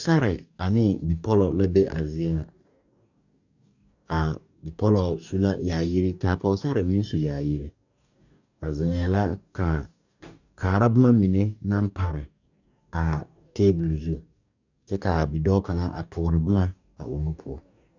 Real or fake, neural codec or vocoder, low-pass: fake; codec, 44.1 kHz, 2.6 kbps, DAC; 7.2 kHz